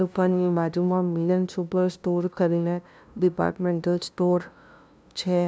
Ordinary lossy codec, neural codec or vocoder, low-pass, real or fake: none; codec, 16 kHz, 0.5 kbps, FunCodec, trained on LibriTTS, 25 frames a second; none; fake